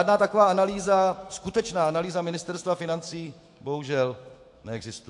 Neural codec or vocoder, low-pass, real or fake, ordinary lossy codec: autoencoder, 48 kHz, 128 numbers a frame, DAC-VAE, trained on Japanese speech; 10.8 kHz; fake; AAC, 64 kbps